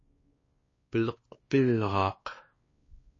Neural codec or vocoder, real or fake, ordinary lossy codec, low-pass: codec, 16 kHz, 2 kbps, X-Codec, WavLM features, trained on Multilingual LibriSpeech; fake; MP3, 32 kbps; 7.2 kHz